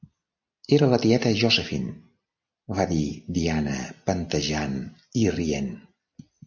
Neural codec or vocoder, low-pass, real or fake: none; 7.2 kHz; real